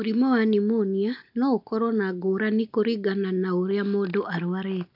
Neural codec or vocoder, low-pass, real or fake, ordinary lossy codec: none; 5.4 kHz; real; AAC, 48 kbps